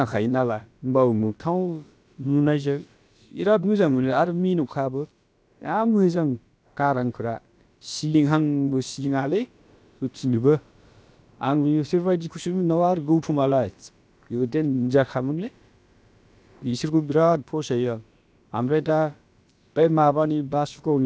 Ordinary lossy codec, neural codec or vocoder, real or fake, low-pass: none; codec, 16 kHz, about 1 kbps, DyCAST, with the encoder's durations; fake; none